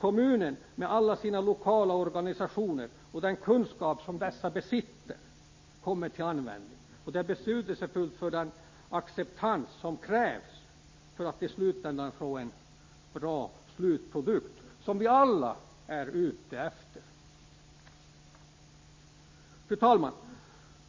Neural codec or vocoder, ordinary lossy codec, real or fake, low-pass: none; MP3, 32 kbps; real; 7.2 kHz